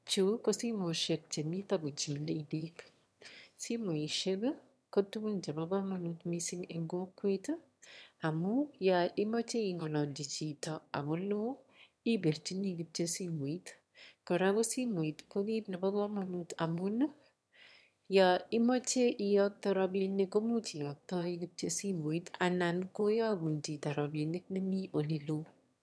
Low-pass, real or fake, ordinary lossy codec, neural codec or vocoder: none; fake; none; autoencoder, 22.05 kHz, a latent of 192 numbers a frame, VITS, trained on one speaker